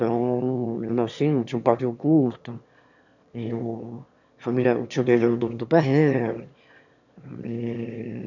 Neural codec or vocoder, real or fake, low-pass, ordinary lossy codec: autoencoder, 22.05 kHz, a latent of 192 numbers a frame, VITS, trained on one speaker; fake; 7.2 kHz; none